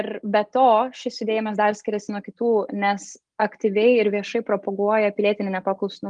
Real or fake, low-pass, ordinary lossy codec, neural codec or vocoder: real; 9.9 kHz; Opus, 32 kbps; none